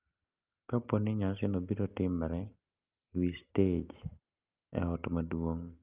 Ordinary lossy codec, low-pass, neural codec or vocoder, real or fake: Opus, 24 kbps; 3.6 kHz; none; real